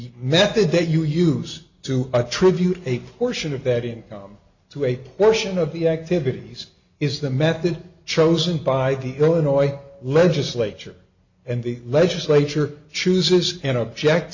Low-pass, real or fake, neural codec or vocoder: 7.2 kHz; real; none